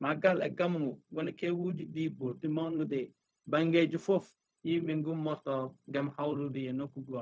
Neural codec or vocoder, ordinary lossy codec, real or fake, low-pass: codec, 16 kHz, 0.4 kbps, LongCat-Audio-Codec; none; fake; none